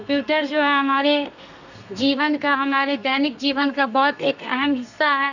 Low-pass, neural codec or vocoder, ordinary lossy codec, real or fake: 7.2 kHz; codec, 32 kHz, 1.9 kbps, SNAC; none; fake